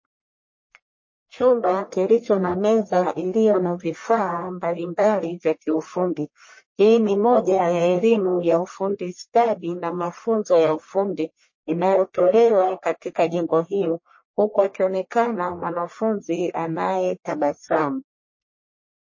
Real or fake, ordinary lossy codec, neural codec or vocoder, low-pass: fake; MP3, 32 kbps; codec, 44.1 kHz, 1.7 kbps, Pupu-Codec; 7.2 kHz